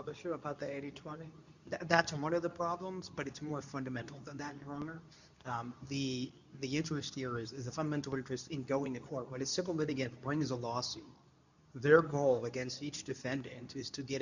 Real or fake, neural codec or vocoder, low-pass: fake; codec, 24 kHz, 0.9 kbps, WavTokenizer, medium speech release version 2; 7.2 kHz